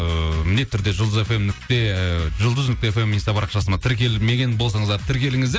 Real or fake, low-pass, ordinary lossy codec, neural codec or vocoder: real; none; none; none